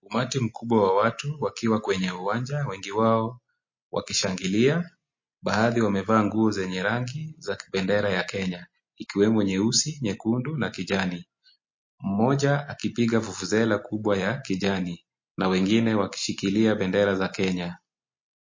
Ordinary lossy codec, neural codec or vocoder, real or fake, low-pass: MP3, 32 kbps; none; real; 7.2 kHz